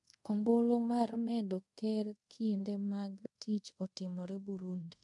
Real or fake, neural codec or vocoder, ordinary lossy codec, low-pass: fake; codec, 24 kHz, 0.5 kbps, DualCodec; MP3, 64 kbps; 10.8 kHz